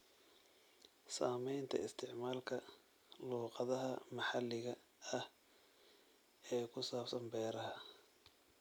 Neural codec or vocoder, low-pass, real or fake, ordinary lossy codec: none; none; real; none